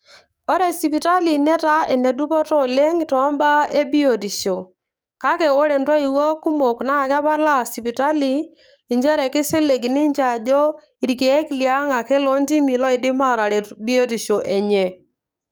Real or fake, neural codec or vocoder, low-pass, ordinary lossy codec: fake; codec, 44.1 kHz, 7.8 kbps, DAC; none; none